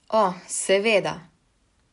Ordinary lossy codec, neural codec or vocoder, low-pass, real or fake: none; none; 10.8 kHz; real